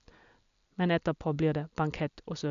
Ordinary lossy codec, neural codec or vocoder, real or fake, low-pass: none; none; real; 7.2 kHz